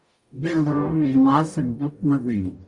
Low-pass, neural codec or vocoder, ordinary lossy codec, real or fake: 10.8 kHz; codec, 44.1 kHz, 0.9 kbps, DAC; Opus, 64 kbps; fake